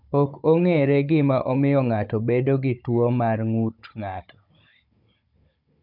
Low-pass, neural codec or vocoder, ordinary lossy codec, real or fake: 5.4 kHz; codec, 16 kHz, 16 kbps, FunCodec, trained on Chinese and English, 50 frames a second; none; fake